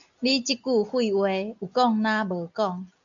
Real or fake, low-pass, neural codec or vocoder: real; 7.2 kHz; none